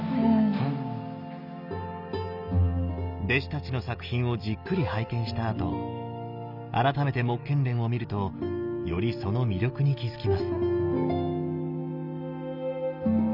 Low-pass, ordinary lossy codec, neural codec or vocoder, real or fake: 5.4 kHz; none; none; real